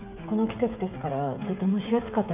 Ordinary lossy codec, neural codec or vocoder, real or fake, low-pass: none; codec, 16 kHz, 16 kbps, FreqCodec, smaller model; fake; 3.6 kHz